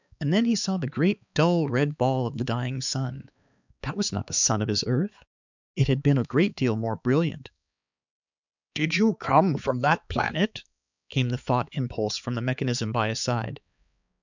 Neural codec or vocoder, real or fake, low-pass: codec, 16 kHz, 4 kbps, X-Codec, HuBERT features, trained on balanced general audio; fake; 7.2 kHz